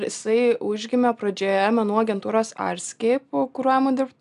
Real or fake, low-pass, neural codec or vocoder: real; 10.8 kHz; none